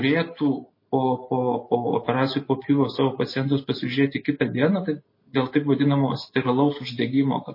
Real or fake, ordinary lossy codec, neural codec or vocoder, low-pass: real; MP3, 24 kbps; none; 5.4 kHz